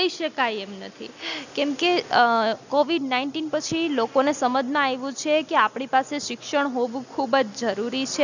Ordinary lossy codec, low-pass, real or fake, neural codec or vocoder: none; 7.2 kHz; real; none